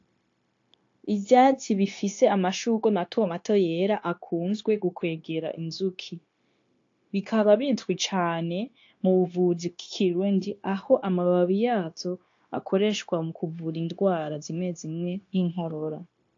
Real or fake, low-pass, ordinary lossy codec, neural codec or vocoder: fake; 7.2 kHz; AAC, 48 kbps; codec, 16 kHz, 0.9 kbps, LongCat-Audio-Codec